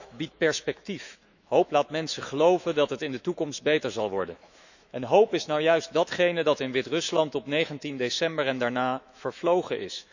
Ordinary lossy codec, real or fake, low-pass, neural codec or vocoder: none; fake; 7.2 kHz; autoencoder, 48 kHz, 128 numbers a frame, DAC-VAE, trained on Japanese speech